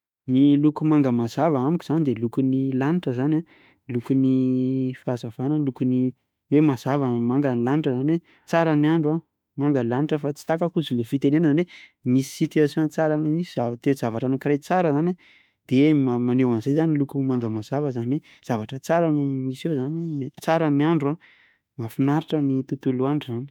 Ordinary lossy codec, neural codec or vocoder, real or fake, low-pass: none; autoencoder, 48 kHz, 32 numbers a frame, DAC-VAE, trained on Japanese speech; fake; 19.8 kHz